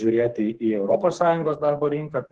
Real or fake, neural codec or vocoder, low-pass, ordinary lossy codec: fake; codec, 44.1 kHz, 2.6 kbps, SNAC; 10.8 kHz; Opus, 16 kbps